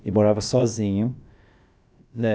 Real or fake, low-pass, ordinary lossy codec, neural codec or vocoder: fake; none; none; codec, 16 kHz, about 1 kbps, DyCAST, with the encoder's durations